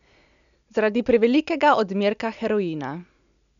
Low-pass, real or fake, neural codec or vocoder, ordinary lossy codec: 7.2 kHz; real; none; none